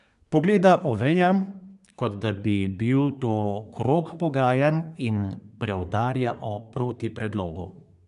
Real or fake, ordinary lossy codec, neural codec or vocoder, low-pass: fake; none; codec, 24 kHz, 1 kbps, SNAC; 10.8 kHz